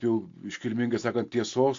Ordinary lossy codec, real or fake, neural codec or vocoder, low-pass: AAC, 48 kbps; real; none; 7.2 kHz